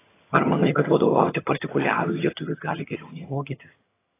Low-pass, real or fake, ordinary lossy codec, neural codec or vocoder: 3.6 kHz; fake; AAC, 16 kbps; vocoder, 22.05 kHz, 80 mel bands, HiFi-GAN